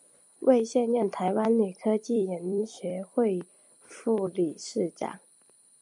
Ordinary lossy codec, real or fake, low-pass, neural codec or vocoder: MP3, 96 kbps; fake; 10.8 kHz; vocoder, 44.1 kHz, 128 mel bands every 256 samples, BigVGAN v2